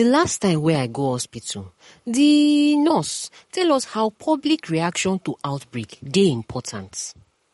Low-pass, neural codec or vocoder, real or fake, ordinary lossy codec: 19.8 kHz; codec, 44.1 kHz, 7.8 kbps, Pupu-Codec; fake; MP3, 48 kbps